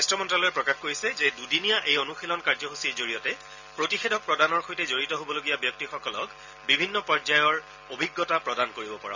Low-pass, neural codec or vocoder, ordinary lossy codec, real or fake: 7.2 kHz; none; none; real